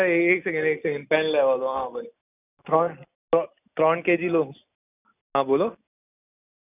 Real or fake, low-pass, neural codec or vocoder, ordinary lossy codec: real; 3.6 kHz; none; none